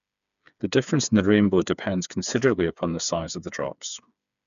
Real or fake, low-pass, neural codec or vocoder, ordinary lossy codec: fake; 7.2 kHz; codec, 16 kHz, 4 kbps, FreqCodec, smaller model; none